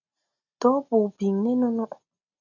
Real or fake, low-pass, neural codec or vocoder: real; 7.2 kHz; none